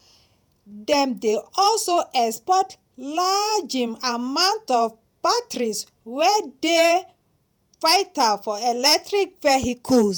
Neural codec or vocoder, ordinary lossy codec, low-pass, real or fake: vocoder, 48 kHz, 128 mel bands, Vocos; none; none; fake